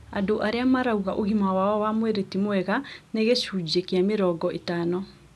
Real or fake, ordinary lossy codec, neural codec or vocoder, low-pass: real; none; none; none